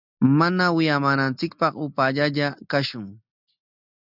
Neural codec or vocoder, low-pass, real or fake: none; 5.4 kHz; real